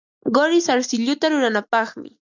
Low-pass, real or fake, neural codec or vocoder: 7.2 kHz; real; none